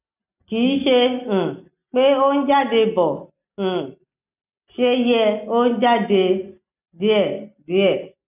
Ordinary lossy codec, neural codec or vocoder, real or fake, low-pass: none; none; real; 3.6 kHz